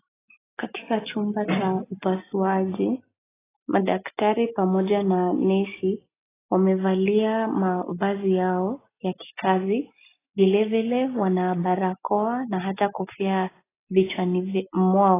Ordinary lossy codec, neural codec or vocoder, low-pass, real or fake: AAC, 16 kbps; none; 3.6 kHz; real